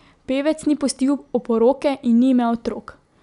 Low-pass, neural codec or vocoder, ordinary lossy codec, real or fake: 10.8 kHz; none; none; real